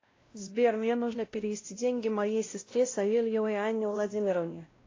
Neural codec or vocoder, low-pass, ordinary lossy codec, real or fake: codec, 16 kHz, 0.5 kbps, X-Codec, WavLM features, trained on Multilingual LibriSpeech; 7.2 kHz; AAC, 32 kbps; fake